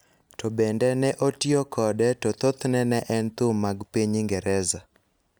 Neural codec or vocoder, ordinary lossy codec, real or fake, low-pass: none; none; real; none